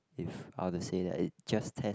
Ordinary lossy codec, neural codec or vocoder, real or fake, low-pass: none; none; real; none